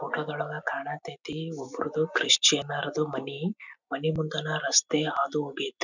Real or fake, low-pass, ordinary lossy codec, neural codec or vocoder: real; 7.2 kHz; none; none